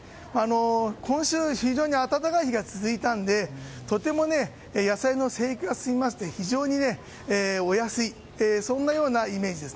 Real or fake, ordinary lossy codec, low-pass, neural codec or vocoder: real; none; none; none